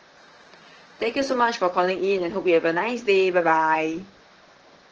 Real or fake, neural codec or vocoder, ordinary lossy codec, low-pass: fake; vocoder, 44.1 kHz, 128 mel bands, Pupu-Vocoder; Opus, 16 kbps; 7.2 kHz